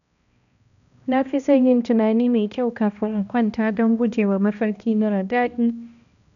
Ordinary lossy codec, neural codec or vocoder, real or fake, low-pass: none; codec, 16 kHz, 1 kbps, X-Codec, HuBERT features, trained on balanced general audio; fake; 7.2 kHz